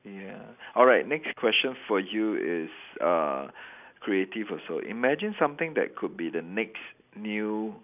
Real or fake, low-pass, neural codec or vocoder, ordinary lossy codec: real; 3.6 kHz; none; none